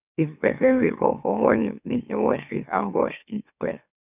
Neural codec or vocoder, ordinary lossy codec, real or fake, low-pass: autoencoder, 44.1 kHz, a latent of 192 numbers a frame, MeloTTS; none; fake; 3.6 kHz